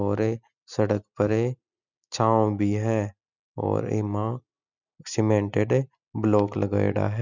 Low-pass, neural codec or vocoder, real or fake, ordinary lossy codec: 7.2 kHz; none; real; none